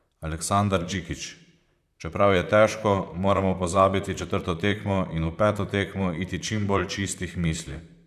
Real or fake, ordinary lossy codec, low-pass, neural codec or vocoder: fake; none; 14.4 kHz; vocoder, 44.1 kHz, 128 mel bands, Pupu-Vocoder